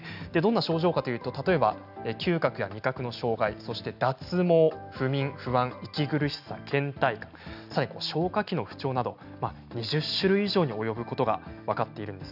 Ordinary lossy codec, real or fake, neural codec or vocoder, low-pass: none; real; none; 5.4 kHz